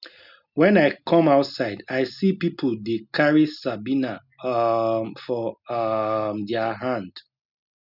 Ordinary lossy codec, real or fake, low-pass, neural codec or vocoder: none; real; 5.4 kHz; none